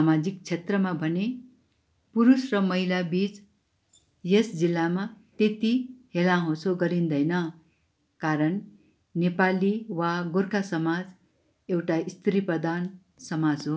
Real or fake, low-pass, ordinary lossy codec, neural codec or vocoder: real; none; none; none